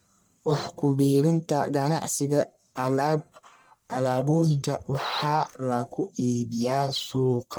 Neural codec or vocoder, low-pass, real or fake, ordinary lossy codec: codec, 44.1 kHz, 1.7 kbps, Pupu-Codec; none; fake; none